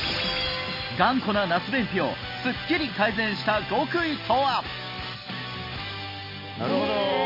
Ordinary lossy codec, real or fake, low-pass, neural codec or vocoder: MP3, 24 kbps; real; 5.4 kHz; none